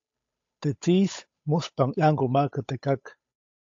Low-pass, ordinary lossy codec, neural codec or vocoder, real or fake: 7.2 kHz; AAC, 48 kbps; codec, 16 kHz, 8 kbps, FunCodec, trained on Chinese and English, 25 frames a second; fake